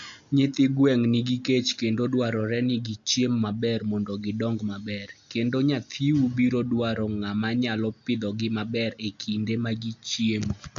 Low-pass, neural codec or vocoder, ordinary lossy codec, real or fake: 7.2 kHz; none; AAC, 64 kbps; real